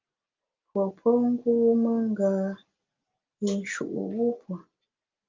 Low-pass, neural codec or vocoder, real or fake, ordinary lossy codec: 7.2 kHz; none; real; Opus, 24 kbps